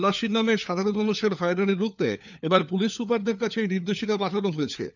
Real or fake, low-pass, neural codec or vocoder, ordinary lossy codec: fake; 7.2 kHz; codec, 16 kHz, 4 kbps, FunCodec, trained on LibriTTS, 50 frames a second; none